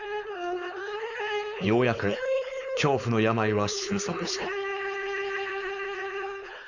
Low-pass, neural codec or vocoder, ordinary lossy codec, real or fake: 7.2 kHz; codec, 16 kHz, 4.8 kbps, FACodec; none; fake